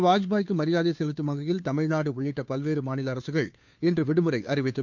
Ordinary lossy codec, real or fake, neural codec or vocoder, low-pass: none; fake; codec, 16 kHz, 2 kbps, FunCodec, trained on Chinese and English, 25 frames a second; 7.2 kHz